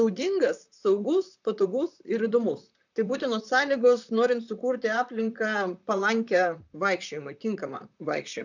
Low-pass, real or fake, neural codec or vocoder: 7.2 kHz; fake; vocoder, 44.1 kHz, 128 mel bands, Pupu-Vocoder